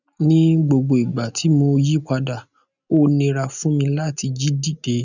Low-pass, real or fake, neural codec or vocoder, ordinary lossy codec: 7.2 kHz; real; none; none